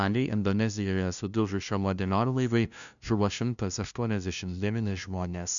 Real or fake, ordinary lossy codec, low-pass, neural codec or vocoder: fake; MP3, 96 kbps; 7.2 kHz; codec, 16 kHz, 0.5 kbps, FunCodec, trained on LibriTTS, 25 frames a second